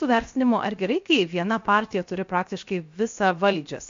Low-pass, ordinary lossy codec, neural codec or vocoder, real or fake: 7.2 kHz; MP3, 48 kbps; codec, 16 kHz, 0.7 kbps, FocalCodec; fake